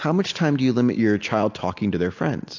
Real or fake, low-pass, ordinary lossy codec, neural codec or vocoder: real; 7.2 kHz; AAC, 48 kbps; none